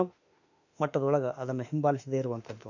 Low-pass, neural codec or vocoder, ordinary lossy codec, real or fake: 7.2 kHz; autoencoder, 48 kHz, 32 numbers a frame, DAC-VAE, trained on Japanese speech; none; fake